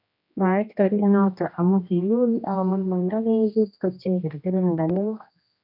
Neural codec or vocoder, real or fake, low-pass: codec, 16 kHz, 1 kbps, X-Codec, HuBERT features, trained on general audio; fake; 5.4 kHz